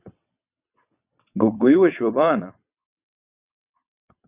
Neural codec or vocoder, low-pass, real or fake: vocoder, 22.05 kHz, 80 mel bands, WaveNeXt; 3.6 kHz; fake